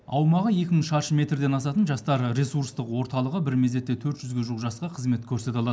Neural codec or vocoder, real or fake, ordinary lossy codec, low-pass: none; real; none; none